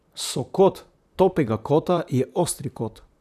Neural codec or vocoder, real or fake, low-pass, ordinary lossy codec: vocoder, 44.1 kHz, 128 mel bands, Pupu-Vocoder; fake; 14.4 kHz; none